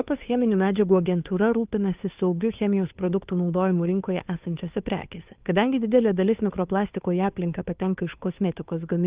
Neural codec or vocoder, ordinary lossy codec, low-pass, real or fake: codec, 16 kHz, 2 kbps, FunCodec, trained on Chinese and English, 25 frames a second; Opus, 24 kbps; 3.6 kHz; fake